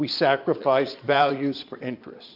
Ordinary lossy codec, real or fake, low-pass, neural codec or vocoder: AAC, 48 kbps; fake; 5.4 kHz; vocoder, 22.05 kHz, 80 mel bands, Vocos